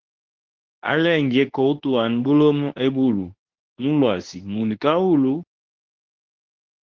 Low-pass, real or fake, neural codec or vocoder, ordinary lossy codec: 7.2 kHz; fake; codec, 24 kHz, 0.9 kbps, WavTokenizer, medium speech release version 2; Opus, 16 kbps